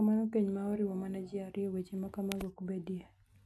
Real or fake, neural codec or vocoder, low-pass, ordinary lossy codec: real; none; none; none